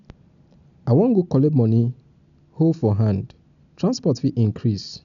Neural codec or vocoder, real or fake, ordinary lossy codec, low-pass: none; real; none; 7.2 kHz